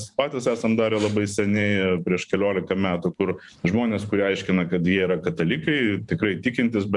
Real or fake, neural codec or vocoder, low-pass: fake; vocoder, 44.1 kHz, 128 mel bands every 512 samples, BigVGAN v2; 10.8 kHz